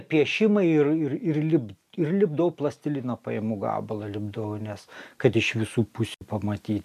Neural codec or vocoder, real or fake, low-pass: none; real; 14.4 kHz